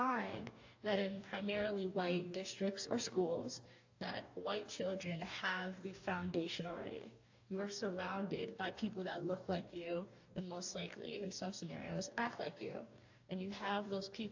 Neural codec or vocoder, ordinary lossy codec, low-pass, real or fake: codec, 44.1 kHz, 2.6 kbps, DAC; AAC, 48 kbps; 7.2 kHz; fake